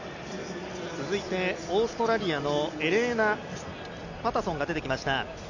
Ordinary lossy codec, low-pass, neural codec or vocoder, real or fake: none; 7.2 kHz; none; real